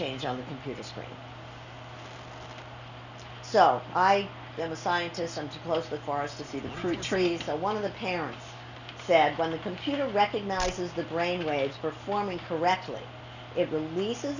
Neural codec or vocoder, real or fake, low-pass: none; real; 7.2 kHz